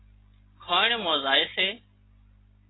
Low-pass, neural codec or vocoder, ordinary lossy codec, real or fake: 7.2 kHz; none; AAC, 16 kbps; real